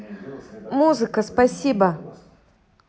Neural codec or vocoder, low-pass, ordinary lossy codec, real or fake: none; none; none; real